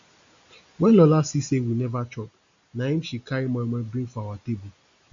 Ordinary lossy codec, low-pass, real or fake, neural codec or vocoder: AAC, 64 kbps; 7.2 kHz; real; none